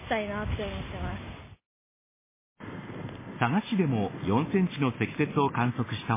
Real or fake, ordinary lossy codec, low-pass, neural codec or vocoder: real; MP3, 16 kbps; 3.6 kHz; none